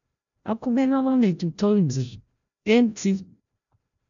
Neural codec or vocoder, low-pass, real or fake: codec, 16 kHz, 0.5 kbps, FreqCodec, larger model; 7.2 kHz; fake